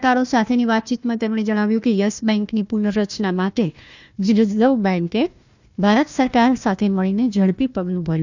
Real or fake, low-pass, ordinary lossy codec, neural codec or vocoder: fake; 7.2 kHz; none; codec, 16 kHz, 1 kbps, FunCodec, trained on Chinese and English, 50 frames a second